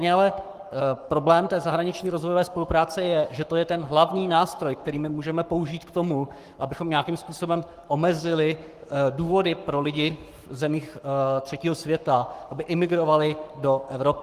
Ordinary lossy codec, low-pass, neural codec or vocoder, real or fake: Opus, 24 kbps; 14.4 kHz; codec, 44.1 kHz, 7.8 kbps, Pupu-Codec; fake